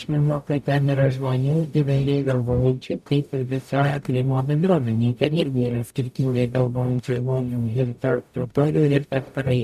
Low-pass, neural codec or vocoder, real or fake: 14.4 kHz; codec, 44.1 kHz, 0.9 kbps, DAC; fake